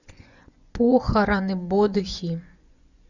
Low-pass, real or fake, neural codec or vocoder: 7.2 kHz; fake; vocoder, 24 kHz, 100 mel bands, Vocos